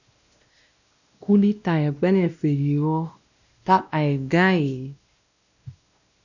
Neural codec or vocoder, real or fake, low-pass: codec, 16 kHz, 1 kbps, X-Codec, WavLM features, trained on Multilingual LibriSpeech; fake; 7.2 kHz